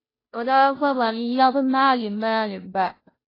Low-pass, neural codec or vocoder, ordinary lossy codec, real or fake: 5.4 kHz; codec, 16 kHz, 0.5 kbps, FunCodec, trained on Chinese and English, 25 frames a second; AAC, 24 kbps; fake